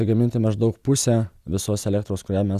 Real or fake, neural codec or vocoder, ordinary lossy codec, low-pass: real; none; Opus, 64 kbps; 14.4 kHz